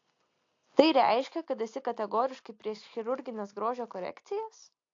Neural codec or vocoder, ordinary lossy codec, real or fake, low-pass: none; AAC, 48 kbps; real; 7.2 kHz